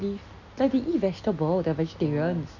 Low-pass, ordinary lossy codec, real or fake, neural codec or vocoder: 7.2 kHz; none; real; none